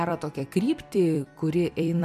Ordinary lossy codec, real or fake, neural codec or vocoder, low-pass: MP3, 96 kbps; fake; vocoder, 44.1 kHz, 128 mel bands every 256 samples, BigVGAN v2; 14.4 kHz